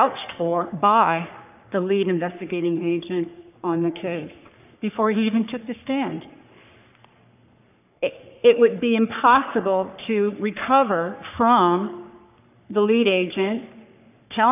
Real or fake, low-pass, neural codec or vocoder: fake; 3.6 kHz; codec, 44.1 kHz, 3.4 kbps, Pupu-Codec